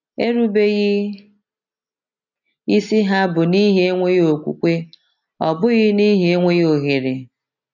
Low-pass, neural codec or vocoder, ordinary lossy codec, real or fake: 7.2 kHz; none; none; real